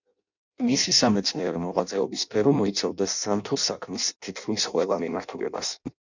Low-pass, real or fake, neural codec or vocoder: 7.2 kHz; fake; codec, 16 kHz in and 24 kHz out, 0.6 kbps, FireRedTTS-2 codec